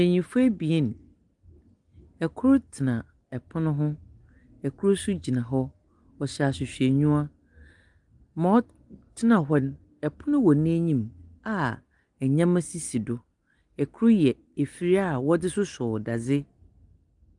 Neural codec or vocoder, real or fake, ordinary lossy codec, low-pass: none; real; Opus, 24 kbps; 10.8 kHz